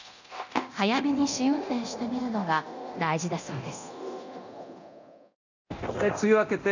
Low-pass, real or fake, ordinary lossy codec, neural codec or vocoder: 7.2 kHz; fake; none; codec, 24 kHz, 0.9 kbps, DualCodec